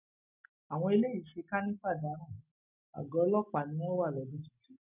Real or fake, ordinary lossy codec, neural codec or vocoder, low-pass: real; none; none; 3.6 kHz